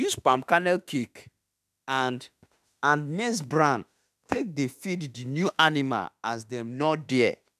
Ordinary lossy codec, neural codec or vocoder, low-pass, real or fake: none; autoencoder, 48 kHz, 32 numbers a frame, DAC-VAE, trained on Japanese speech; 14.4 kHz; fake